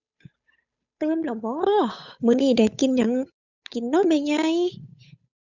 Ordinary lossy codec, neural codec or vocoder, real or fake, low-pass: none; codec, 16 kHz, 8 kbps, FunCodec, trained on Chinese and English, 25 frames a second; fake; 7.2 kHz